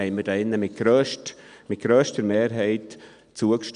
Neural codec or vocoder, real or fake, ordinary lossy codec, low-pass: none; real; none; 9.9 kHz